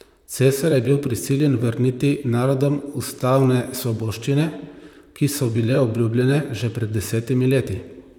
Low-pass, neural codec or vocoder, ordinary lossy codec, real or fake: 19.8 kHz; vocoder, 44.1 kHz, 128 mel bands, Pupu-Vocoder; none; fake